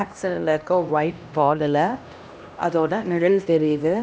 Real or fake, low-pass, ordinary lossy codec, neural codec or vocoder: fake; none; none; codec, 16 kHz, 1 kbps, X-Codec, HuBERT features, trained on LibriSpeech